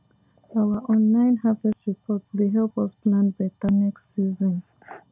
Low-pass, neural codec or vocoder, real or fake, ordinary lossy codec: 3.6 kHz; none; real; none